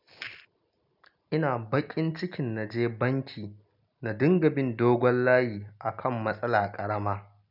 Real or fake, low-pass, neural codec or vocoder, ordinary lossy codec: real; 5.4 kHz; none; none